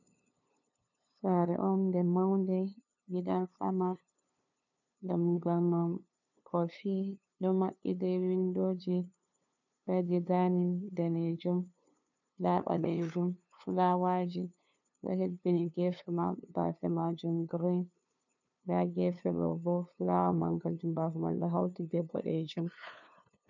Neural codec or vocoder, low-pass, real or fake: codec, 16 kHz, 2 kbps, FunCodec, trained on LibriTTS, 25 frames a second; 7.2 kHz; fake